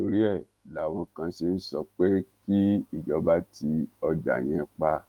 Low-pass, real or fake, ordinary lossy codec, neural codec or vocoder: 19.8 kHz; fake; Opus, 32 kbps; autoencoder, 48 kHz, 128 numbers a frame, DAC-VAE, trained on Japanese speech